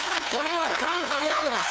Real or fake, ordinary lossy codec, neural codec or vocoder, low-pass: fake; none; codec, 16 kHz, 2 kbps, FunCodec, trained on LibriTTS, 25 frames a second; none